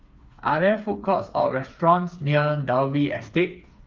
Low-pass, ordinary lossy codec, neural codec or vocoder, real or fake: 7.2 kHz; Opus, 32 kbps; codec, 16 kHz, 4 kbps, FreqCodec, smaller model; fake